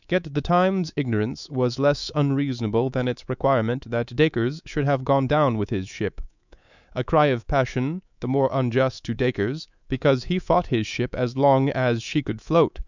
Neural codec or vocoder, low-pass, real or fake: codec, 24 kHz, 3.1 kbps, DualCodec; 7.2 kHz; fake